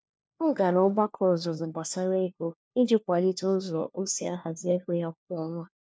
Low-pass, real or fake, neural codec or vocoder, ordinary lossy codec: none; fake; codec, 16 kHz, 1 kbps, FunCodec, trained on LibriTTS, 50 frames a second; none